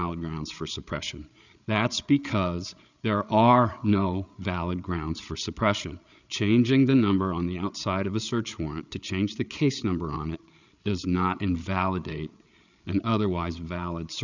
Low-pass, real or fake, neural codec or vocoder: 7.2 kHz; fake; codec, 16 kHz, 8 kbps, FreqCodec, larger model